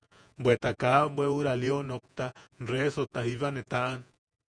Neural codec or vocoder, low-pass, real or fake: vocoder, 48 kHz, 128 mel bands, Vocos; 9.9 kHz; fake